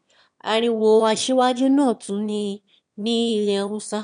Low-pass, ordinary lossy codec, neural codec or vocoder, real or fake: 9.9 kHz; none; autoencoder, 22.05 kHz, a latent of 192 numbers a frame, VITS, trained on one speaker; fake